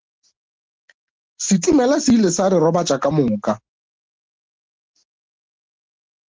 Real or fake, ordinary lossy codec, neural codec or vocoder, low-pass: real; Opus, 16 kbps; none; 7.2 kHz